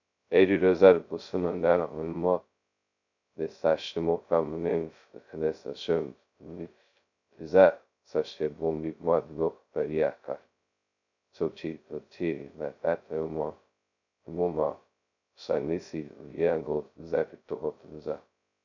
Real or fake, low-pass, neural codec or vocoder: fake; 7.2 kHz; codec, 16 kHz, 0.2 kbps, FocalCodec